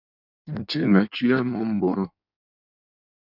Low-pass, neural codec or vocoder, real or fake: 5.4 kHz; codec, 16 kHz in and 24 kHz out, 1.1 kbps, FireRedTTS-2 codec; fake